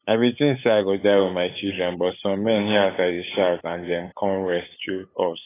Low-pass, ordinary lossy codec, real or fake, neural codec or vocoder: 3.6 kHz; AAC, 16 kbps; fake; codec, 16 kHz, 8 kbps, FunCodec, trained on LibriTTS, 25 frames a second